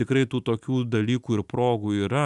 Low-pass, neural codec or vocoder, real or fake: 10.8 kHz; none; real